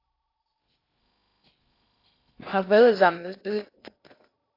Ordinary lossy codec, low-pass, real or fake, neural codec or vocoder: AAC, 32 kbps; 5.4 kHz; fake; codec, 16 kHz in and 24 kHz out, 0.8 kbps, FocalCodec, streaming, 65536 codes